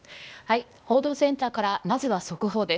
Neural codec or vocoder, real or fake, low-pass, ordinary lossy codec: codec, 16 kHz, 0.8 kbps, ZipCodec; fake; none; none